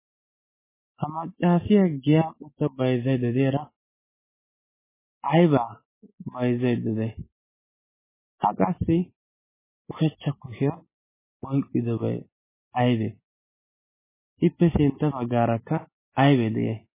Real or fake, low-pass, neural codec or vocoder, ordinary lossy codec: real; 3.6 kHz; none; MP3, 16 kbps